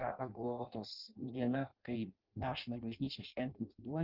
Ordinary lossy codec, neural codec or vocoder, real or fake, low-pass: Opus, 32 kbps; codec, 16 kHz in and 24 kHz out, 0.6 kbps, FireRedTTS-2 codec; fake; 5.4 kHz